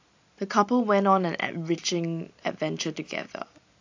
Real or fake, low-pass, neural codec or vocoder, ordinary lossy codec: real; 7.2 kHz; none; AAC, 48 kbps